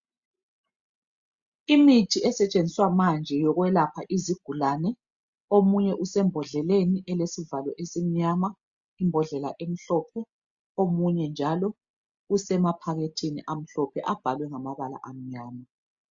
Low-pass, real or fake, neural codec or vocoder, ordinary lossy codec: 7.2 kHz; real; none; Opus, 64 kbps